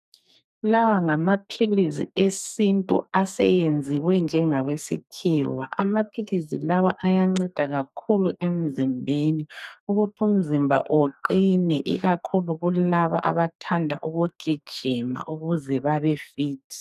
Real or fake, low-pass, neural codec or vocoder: fake; 14.4 kHz; codec, 44.1 kHz, 2.6 kbps, SNAC